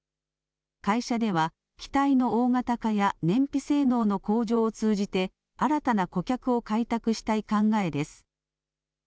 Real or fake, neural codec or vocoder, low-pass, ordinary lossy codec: real; none; none; none